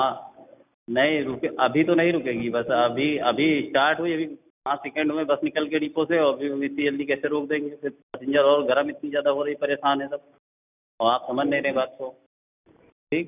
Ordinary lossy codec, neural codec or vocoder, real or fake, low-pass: none; none; real; 3.6 kHz